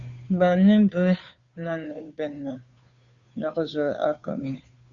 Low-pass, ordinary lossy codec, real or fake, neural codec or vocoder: 7.2 kHz; Opus, 64 kbps; fake; codec, 16 kHz, 2 kbps, FunCodec, trained on Chinese and English, 25 frames a second